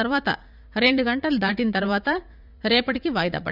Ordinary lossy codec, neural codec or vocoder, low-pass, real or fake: none; vocoder, 44.1 kHz, 80 mel bands, Vocos; 5.4 kHz; fake